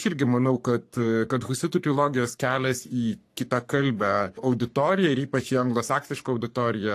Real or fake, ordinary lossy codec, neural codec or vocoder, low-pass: fake; AAC, 64 kbps; codec, 44.1 kHz, 3.4 kbps, Pupu-Codec; 14.4 kHz